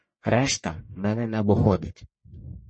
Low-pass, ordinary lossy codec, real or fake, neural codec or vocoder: 9.9 kHz; MP3, 32 kbps; fake; codec, 44.1 kHz, 1.7 kbps, Pupu-Codec